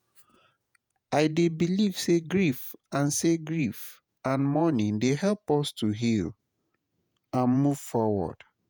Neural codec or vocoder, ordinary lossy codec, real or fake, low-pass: vocoder, 48 kHz, 128 mel bands, Vocos; none; fake; none